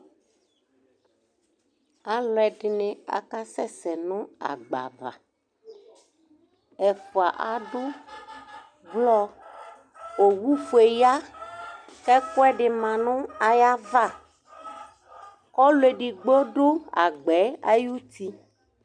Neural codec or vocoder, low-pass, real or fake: none; 9.9 kHz; real